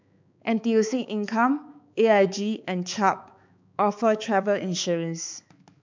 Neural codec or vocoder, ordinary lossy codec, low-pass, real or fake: codec, 16 kHz, 4 kbps, X-Codec, HuBERT features, trained on balanced general audio; MP3, 64 kbps; 7.2 kHz; fake